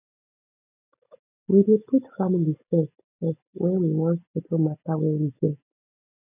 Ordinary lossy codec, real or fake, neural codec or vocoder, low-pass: none; real; none; 3.6 kHz